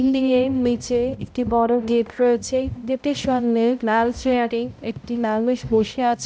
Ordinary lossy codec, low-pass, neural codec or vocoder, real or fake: none; none; codec, 16 kHz, 0.5 kbps, X-Codec, HuBERT features, trained on balanced general audio; fake